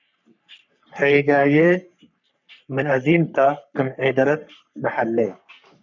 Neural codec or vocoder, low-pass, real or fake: codec, 44.1 kHz, 3.4 kbps, Pupu-Codec; 7.2 kHz; fake